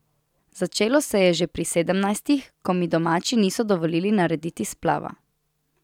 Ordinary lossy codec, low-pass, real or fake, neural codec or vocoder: none; 19.8 kHz; real; none